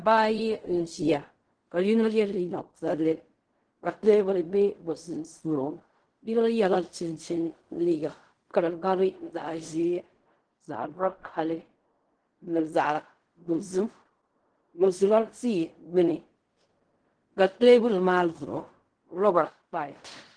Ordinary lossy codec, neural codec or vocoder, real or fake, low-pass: Opus, 16 kbps; codec, 16 kHz in and 24 kHz out, 0.4 kbps, LongCat-Audio-Codec, fine tuned four codebook decoder; fake; 9.9 kHz